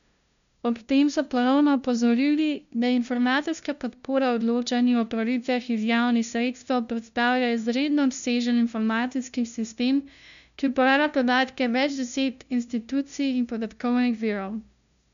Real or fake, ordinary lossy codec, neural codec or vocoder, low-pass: fake; none; codec, 16 kHz, 0.5 kbps, FunCodec, trained on LibriTTS, 25 frames a second; 7.2 kHz